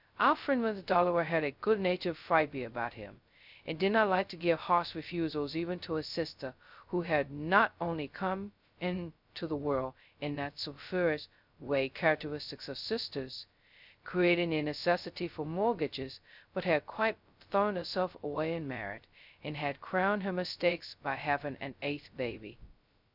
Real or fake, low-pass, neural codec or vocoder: fake; 5.4 kHz; codec, 16 kHz, 0.2 kbps, FocalCodec